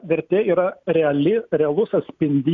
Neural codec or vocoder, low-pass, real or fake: none; 7.2 kHz; real